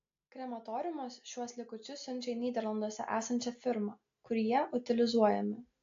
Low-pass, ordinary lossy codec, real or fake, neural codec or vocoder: 7.2 kHz; AAC, 48 kbps; real; none